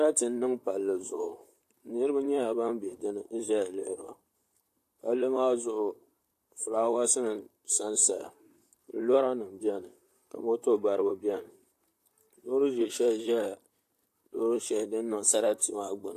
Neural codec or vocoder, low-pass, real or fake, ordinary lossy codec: vocoder, 44.1 kHz, 128 mel bands, Pupu-Vocoder; 14.4 kHz; fake; AAC, 64 kbps